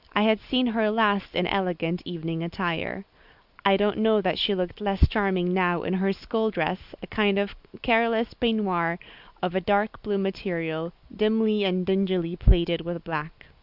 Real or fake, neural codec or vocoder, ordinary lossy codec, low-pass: real; none; Opus, 64 kbps; 5.4 kHz